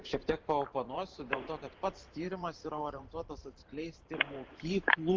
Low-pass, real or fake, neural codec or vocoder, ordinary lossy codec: 7.2 kHz; fake; vocoder, 44.1 kHz, 128 mel bands every 512 samples, BigVGAN v2; Opus, 16 kbps